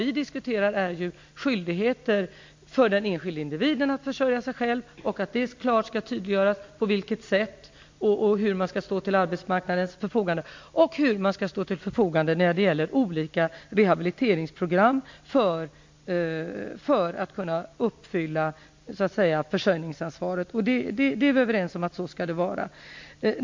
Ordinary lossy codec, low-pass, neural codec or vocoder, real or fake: MP3, 48 kbps; 7.2 kHz; none; real